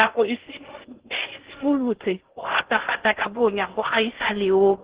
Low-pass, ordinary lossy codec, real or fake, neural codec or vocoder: 3.6 kHz; Opus, 16 kbps; fake; codec, 16 kHz in and 24 kHz out, 0.6 kbps, FocalCodec, streaming, 2048 codes